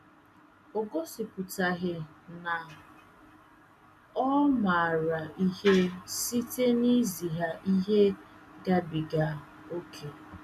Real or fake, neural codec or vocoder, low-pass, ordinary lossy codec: real; none; 14.4 kHz; none